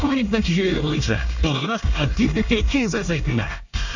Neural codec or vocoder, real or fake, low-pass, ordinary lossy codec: codec, 24 kHz, 1 kbps, SNAC; fake; 7.2 kHz; none